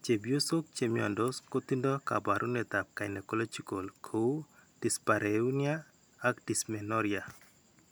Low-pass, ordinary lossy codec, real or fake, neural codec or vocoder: none; none; real; none